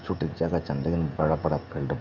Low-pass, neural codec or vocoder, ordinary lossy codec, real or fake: 7.2 kHz; codec, 16 kHz, 16 kbps, FreqCodec, smaller model; none; fake